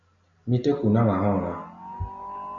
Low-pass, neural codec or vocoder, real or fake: 7.2 kHz; none; real